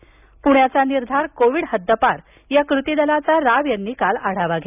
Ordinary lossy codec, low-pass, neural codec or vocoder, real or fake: none; 3.6 kHz; none; real